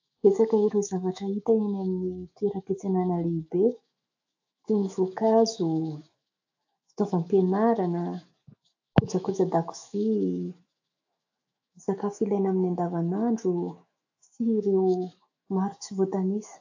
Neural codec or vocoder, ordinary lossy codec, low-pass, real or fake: autoencoder, 48 kHz, 128 numbers a frame, DAC-VAE, trained on Japanese speech; AAC, 48 kbps; 7.2 kHz; fake